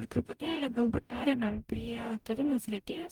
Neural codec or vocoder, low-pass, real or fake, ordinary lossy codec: codec, 44.1 kHz, 0.9 kbps, DAC; 19.8 kHz; fake; Opus, 32 kbps